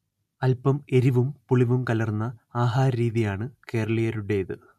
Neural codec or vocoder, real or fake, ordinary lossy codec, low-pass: none; real; MP3, 64 kbps; 14.4 kHz